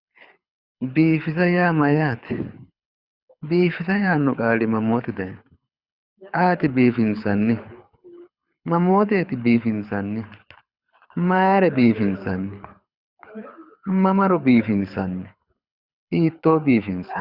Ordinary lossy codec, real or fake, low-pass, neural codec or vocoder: Opus, 64 kbps; fake; 5.4 kHz; codec, 24 kHz, 6 kbps, HILCodec